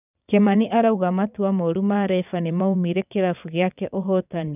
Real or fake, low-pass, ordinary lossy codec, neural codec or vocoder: fake; 3.6 kHz; none; vocoder, 22.05 kHz, 80 mel bands, WaveNeXt